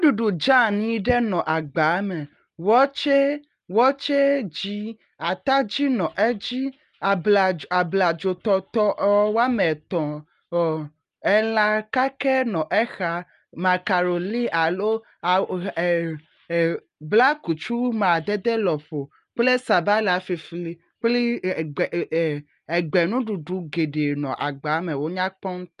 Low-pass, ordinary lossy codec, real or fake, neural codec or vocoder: 10.8 kHz; Opus, 32 kbps; real; none